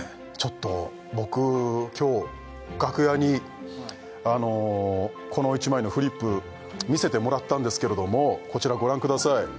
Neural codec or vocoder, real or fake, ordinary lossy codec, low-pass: none; real; none; none